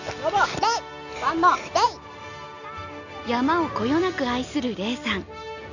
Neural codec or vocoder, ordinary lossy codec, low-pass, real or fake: none; none; 7.2 kHz; real